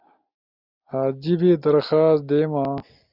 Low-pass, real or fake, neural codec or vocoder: 5.4 kHz; real; none